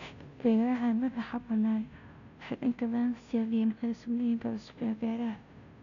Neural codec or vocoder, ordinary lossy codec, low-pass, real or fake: codec, 16 kHz, 0.5 kbps, FunCodec, trained on Chinese and English, 25 frames a second; none; 7.2 kHz; fake